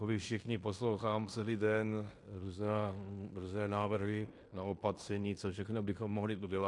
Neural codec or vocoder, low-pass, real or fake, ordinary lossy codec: codec, 16 kHz in and 24 kHz out, 0.9 kbps, LongCat-Audio-Codec, fine tuned four codebook decoder; 10.8 kHz; fake; MP3, 48 kbps